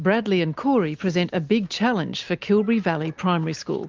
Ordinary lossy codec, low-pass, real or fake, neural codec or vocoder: Opus, 24 kbps; 7.2 kHz; real; none